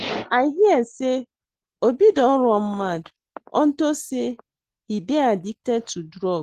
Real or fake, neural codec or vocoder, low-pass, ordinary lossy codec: real; none; 14.4 kHz; Opus, 16 kbps